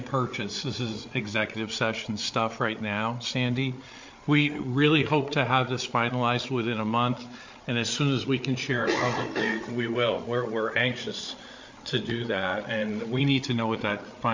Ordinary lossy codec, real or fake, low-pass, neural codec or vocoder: MP3, 48 kbps; fake; 7.2 kHz; codec, 16 kHz, 8 kbps, FreqCodec, larger model